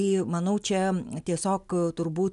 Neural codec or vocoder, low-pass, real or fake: none; 10.8 kHz; real